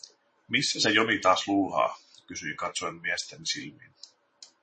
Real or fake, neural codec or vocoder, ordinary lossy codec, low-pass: fake; vocoder, 44.1 kHz, 128 mel bands every 512 samples, BigVGAN v2; MP3, 32 kbps; 9.9 kHz